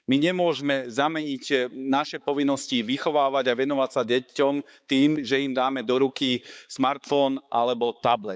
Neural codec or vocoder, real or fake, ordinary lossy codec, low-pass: codec, 16 kHz, 4 kbps, X-Codec, HuBERT features, trained on balanced general audio; fake; none; none